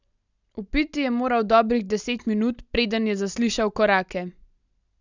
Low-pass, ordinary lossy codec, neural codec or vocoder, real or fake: 7.2 kHz; none; none; real